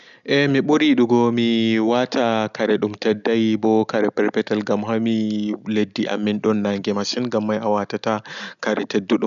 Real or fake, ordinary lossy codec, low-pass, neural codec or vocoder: real; none; 7.2 kHz; none